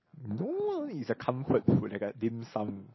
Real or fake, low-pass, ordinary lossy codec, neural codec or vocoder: real; 7.2 kHz; MP3, 24 kbps; none